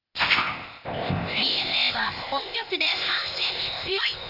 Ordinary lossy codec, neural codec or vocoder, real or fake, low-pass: none; codec, 16 kHz, 0.8 kbps, ZipCodec; fake; 5.4 kHz